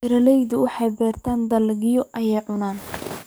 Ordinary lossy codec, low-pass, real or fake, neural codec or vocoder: none; none; fake; codec, 44.1 kHz, 7.8 kbps, DAC